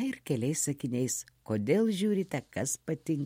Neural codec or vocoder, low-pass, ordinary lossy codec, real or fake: none; 19.8 kHz; MP3, 64 kbps; real